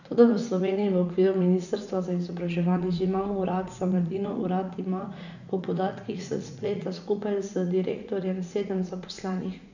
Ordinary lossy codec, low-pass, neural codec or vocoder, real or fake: none; 7.2 kHz; vocoder, 44.1 kHz, 80 mel bands, Vocos; fake